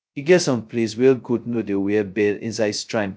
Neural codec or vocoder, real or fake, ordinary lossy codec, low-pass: codec, 16 kHz, 0.2 kbps, FocalCodec; fake; none; none